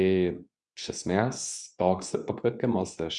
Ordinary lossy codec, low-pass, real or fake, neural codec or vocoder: MP3, 96 kbps; 10.8 kHz; fake; codec, 24 kHz, 0.9 kbps, WavTokenizer, medium speech release version 2